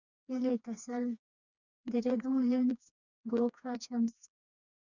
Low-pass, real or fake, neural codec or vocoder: 7.2 kHz; fake; codec, 16 kHz, 2 kbps, FreqCodec, smaller model